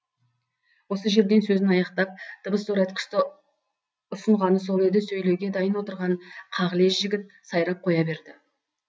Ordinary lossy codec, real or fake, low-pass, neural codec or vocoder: none; real; none; none